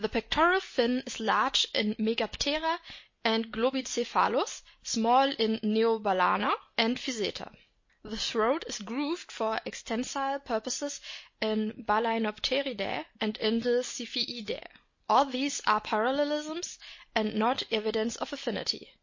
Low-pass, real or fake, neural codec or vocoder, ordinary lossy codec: 7.2 kHz; real; none; MP3, 32 kbps